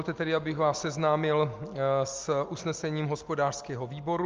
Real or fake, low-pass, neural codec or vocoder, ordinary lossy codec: real; 7.2 kHz; none; Opus, 24 kbps